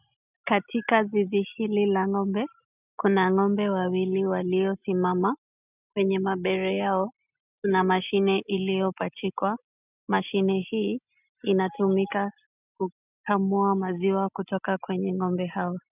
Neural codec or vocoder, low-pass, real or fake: none; 3.6 kHz; real